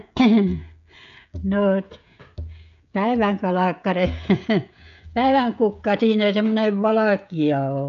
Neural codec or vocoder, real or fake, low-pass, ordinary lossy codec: codec, 16 kHz, 16 kbps, FreqCodec, smaller model; fake; 7.2 kHz; none